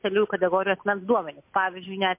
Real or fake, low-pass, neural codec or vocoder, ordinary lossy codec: real; 3.6 kHz; none; MP3, 32 kbps